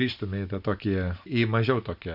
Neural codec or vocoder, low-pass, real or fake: none; 5.4 kHz; real